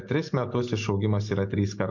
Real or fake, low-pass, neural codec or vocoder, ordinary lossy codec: real; 7.2 kHz; none; MP3, 48 kbps